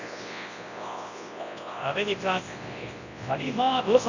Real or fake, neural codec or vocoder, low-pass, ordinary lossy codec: fake; codec, 24 kHz, 0.9 kbps, WavTokenizer, large speech release; 7.2 kHz; none